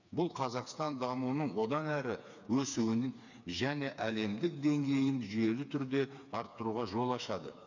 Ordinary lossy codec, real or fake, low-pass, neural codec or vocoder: none; fake; 7.2 kHz; codec, 16 kHz, 4 kbps, FreqCodec, smaller model